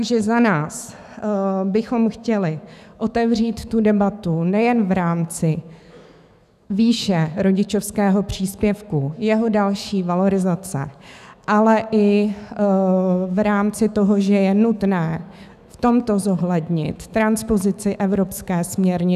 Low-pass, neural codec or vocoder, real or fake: 14.4 kHz; autoencoder, 48 kHz, 128 numbers a frame, DAC-VAE, trained on Japanese speech; fake